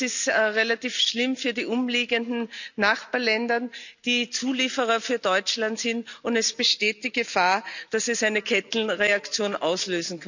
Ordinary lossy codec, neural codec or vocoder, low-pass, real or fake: none; none; 7.2 kHz; real